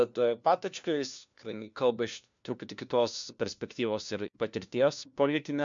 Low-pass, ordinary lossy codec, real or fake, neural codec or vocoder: 7.2 kHz; MP3, 64 kbps; fake; codec, 16 kHz, 1 kbps, FunCodec, trained on LibriTTS, 50 frames a second